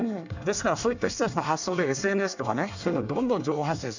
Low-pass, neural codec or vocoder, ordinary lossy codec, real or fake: 7.2 kHz; codec, 24 kHz, 1 kbps, SNAC; none; fake